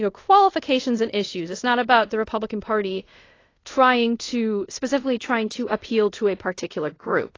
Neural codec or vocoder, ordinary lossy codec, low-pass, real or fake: codec, 24 kHz, 0.5 kbps, DualCodec; AAC, 32 kbps; 7.2 kHz; fake